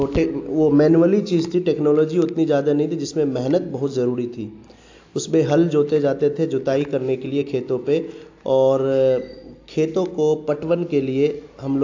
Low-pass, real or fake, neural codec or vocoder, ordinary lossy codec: 7.2 kHz; real; none; AAC, 48 kbps